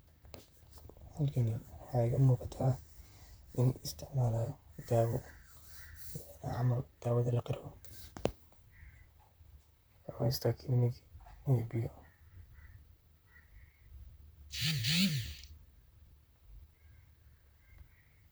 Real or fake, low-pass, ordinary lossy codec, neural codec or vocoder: fake; none; none; vocoder, 44.1 kHz, 128 mel bands, Pupu-Vocoder